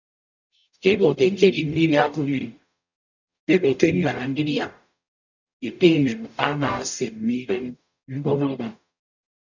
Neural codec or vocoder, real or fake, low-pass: codec, 44.1 kHz, 0.9 kbps, DAC; fake; 7.2 kHz